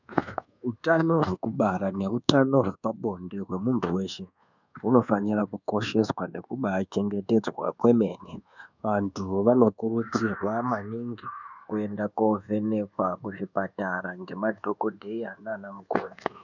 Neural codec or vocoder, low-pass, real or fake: codec, 24 kHz, 1.2 kbps, DualCodec; 7.2 kHz; fake